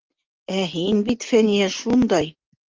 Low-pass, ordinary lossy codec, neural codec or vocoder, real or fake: 7.2 kHz; Opus, 32 kbps; none; real